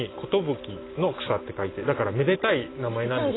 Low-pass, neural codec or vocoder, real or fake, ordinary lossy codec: 7.2 kHz; none; real; AAC, 16 kbps